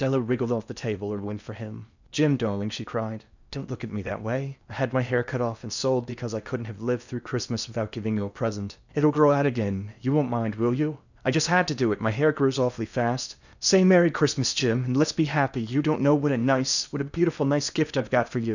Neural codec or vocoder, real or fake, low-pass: codec, 16 kHz in and 24 kHz out, 0.8 kbps, FocalCodec, streaming, 65536 codes; fake; 7.2 kHz